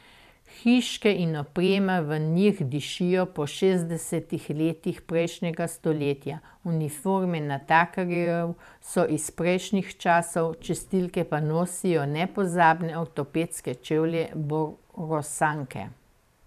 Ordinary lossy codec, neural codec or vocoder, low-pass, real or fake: none; vocoder, 44.1 kHz, 128 mel bands every 512 samples, BigVGAN v2; 14.4 kHz; fake